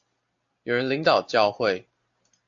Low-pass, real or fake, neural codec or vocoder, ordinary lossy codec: 7.2 kHz; real; none; AAC, 64 kbps